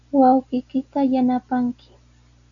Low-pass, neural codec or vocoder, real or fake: 7.2 kHz; none; real